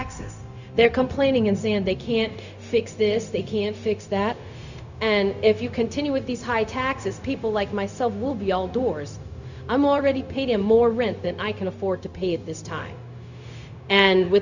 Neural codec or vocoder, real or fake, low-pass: codec, 16 kHz, 0.4 kbps, LongCat-Audio-Codec; fake; 7.2 kHz